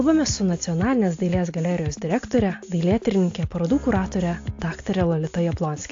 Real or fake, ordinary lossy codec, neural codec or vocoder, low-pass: real; AAC, 48 kbps; none; 7.2 kHz